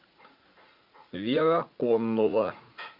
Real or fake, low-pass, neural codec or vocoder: fake; 5.4 kHz; vocoder, 44.1 kHz, 80 mel bands, Vocos